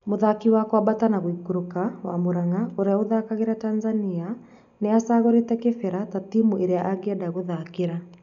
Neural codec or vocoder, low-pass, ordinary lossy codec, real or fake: none; 7.2 kHz; none; real